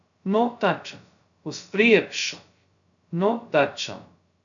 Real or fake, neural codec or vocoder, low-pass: fake; codec, 16 kHz, 0.2 kbps, FocalCodec; 7.2 kHz